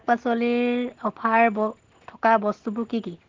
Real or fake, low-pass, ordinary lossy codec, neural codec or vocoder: real; 7.2 kHz; Opus, 16 kbps; none